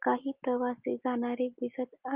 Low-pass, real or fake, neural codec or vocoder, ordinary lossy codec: 3.6 kHz; real; none; Opus, 32 kbps